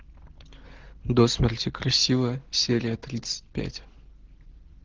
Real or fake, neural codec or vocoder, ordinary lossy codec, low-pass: real; none; Opus, 16 kbps; 7.2 kHz